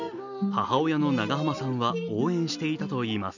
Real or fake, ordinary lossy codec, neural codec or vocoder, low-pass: real; none; none; 7.2 kHz